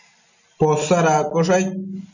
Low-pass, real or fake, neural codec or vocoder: 7.2 kHz; real; none